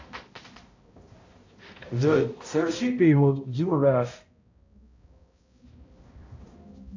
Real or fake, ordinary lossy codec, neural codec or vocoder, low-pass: fake; AAC, 48 kbps; codec, 16 kHz, 0.5 kbps, X-Codec, HuBERT features, trained on balanced general audio; 7.2 kHz